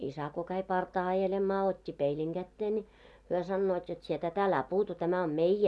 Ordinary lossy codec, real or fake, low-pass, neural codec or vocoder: none; real; none; none